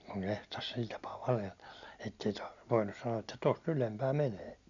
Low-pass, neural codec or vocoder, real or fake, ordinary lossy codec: 7.2 kHz; none; real; none